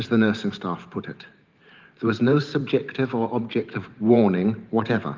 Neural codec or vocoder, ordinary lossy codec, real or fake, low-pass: vocoder, 44.1 kHz, 128 mel bands every 512 samples, BigVGAN v2; Opus, 24 kbps; fake; 7.2 kHz